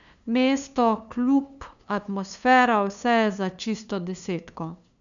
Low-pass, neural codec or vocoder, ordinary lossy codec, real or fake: 7.2 kHz; codec, 16 kHz, 2 kbps, FunCodec, trained on LibriTTS, 25 frames a second; none; fake